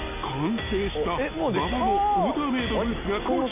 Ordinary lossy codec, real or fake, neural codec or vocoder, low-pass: MP3, 24 kbps; real; none; 3.6 kHz